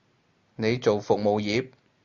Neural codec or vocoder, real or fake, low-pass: none; real; 7.2 kHz